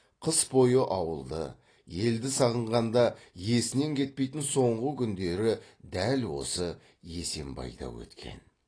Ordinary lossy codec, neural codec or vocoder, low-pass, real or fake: AAC, 32 kbps; none; 9.9 kHz; real